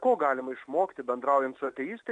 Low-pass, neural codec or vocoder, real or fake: 9.9 kHz; none; real